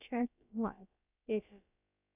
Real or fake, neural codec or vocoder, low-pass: fake; codec, 16 kHz, about 1 kbps, DyCAST, with the encoder's durations; 3.6 kHz